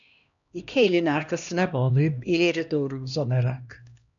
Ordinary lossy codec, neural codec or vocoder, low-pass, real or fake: MP3, 96 kbps; codec, 16 kHz, 1 kbps, X-Codec, HuBERT features, trained on LibriSpeech; 7.2 kHz; fake